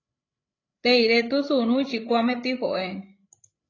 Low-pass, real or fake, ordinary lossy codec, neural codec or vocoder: 7.2 kHz; fake; AAC, 32 kbps; codec, 16 kHz, 16 kbps, FreqCodec, larger model